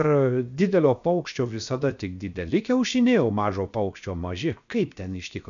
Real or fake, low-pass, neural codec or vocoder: fake; 7.2 kHz; codec, 16 kHz, 0.7 kbps, FocalCodec